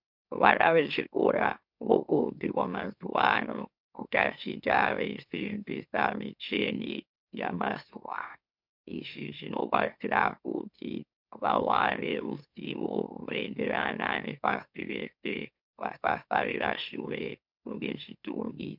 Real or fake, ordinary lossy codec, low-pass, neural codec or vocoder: fake; MP3, 48 kbps; 5.4 kHz; autoencoder, 44.1 kHz, a latent of 192 numbers a frame, MeloTTS